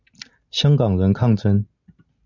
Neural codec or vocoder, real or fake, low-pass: none; real; 7.2 kHz